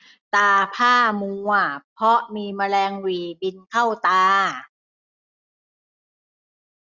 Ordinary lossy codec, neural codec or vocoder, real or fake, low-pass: Opus, 64 kbps; none; real; 7.2 kHz